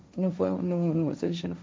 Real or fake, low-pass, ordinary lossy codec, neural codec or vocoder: fake; none; none; codec, 16 kHz, 1.1 kbps, Voila-Tokenizer